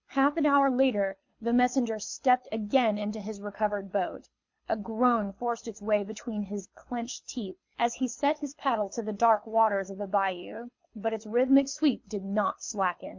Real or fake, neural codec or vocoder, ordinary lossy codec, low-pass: fake; codec, 24 kHz, 6 kbps, HILCodec; MP3, 48 kbps; 7.2 kHz